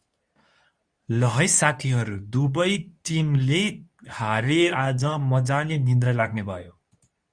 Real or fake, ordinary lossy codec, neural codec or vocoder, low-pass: fake; Opus, 64 kbps; codec, 24 kHz, 0.9 kbps, WavTokenizer, medium speech release version 2; 9.9 kHz